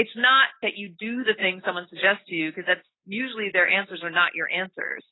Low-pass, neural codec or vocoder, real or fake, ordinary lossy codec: 7.2 kHz; none; real; AAC, 16 kbps